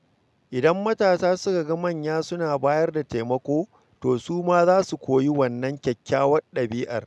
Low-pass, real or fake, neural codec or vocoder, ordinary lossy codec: none; real; none; none